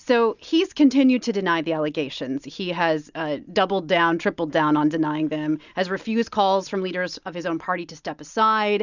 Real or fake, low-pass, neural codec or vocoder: real; 7.2 kHz; none